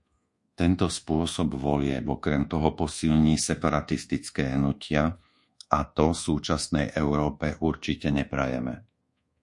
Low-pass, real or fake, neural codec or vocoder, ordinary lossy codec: 10.8 kHz; fake; codec, 24 kHz, 1.2 kbps, DualCodec; MP3, 48 kbps